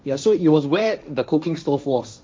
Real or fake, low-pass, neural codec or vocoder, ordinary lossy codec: fake; none; codec, 16 kHz, 1.1 kbps, Voila-Tokenizer; none